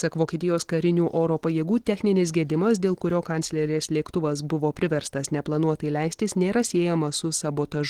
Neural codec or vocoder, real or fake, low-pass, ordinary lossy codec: none; real; 19.8 kHz; Opus, 16 kbps